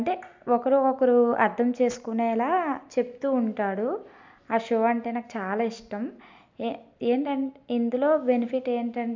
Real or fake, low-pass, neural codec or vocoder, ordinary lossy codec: real; 7.2 kHz; none; MP3, 64 kbps